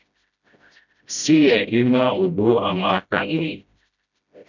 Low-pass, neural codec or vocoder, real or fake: 7.2 kHz; codec, 16 kHz, 0.5 kbps, FreqCodec, smaller model; fake